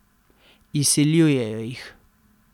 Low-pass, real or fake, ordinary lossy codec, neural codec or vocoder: 19.8 kHz; real; none; none